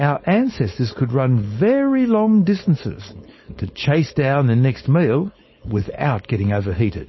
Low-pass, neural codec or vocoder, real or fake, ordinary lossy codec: 7.2 kHz; codec, 16 kHz, 4.8 kbps, FACodec; fake; MP3, 24 kbps